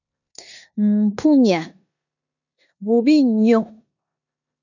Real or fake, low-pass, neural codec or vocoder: fake; 7.2 kHz; codec, 16 kHz in and 24 kHz out, 0.9 kbps, LongCat-Audio-Codec, four codebook decoder